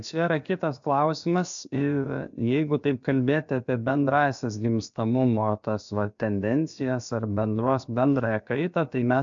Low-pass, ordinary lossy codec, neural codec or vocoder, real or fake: 7.2 kHz; MP3, 64 kbps; codec, 16 kHz, about 1 kbps, DyCAST, with the encoder's durations; fake